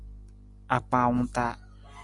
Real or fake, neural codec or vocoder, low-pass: real; none; 10.8 kHz